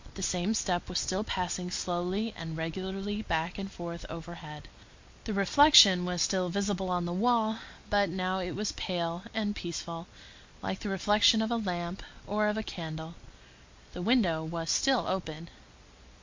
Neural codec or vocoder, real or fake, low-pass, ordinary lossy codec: none; real; 7.2 kHz; MP3, 48 kbps